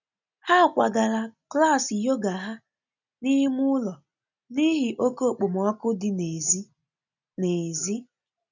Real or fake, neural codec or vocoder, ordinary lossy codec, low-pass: real; none; none; 7.2 kHz